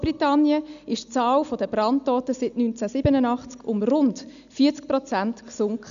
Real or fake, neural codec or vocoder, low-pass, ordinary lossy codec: real; none; 7.2 kHz; none